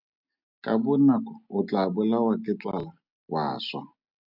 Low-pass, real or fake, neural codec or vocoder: 5.4 kHz; real; none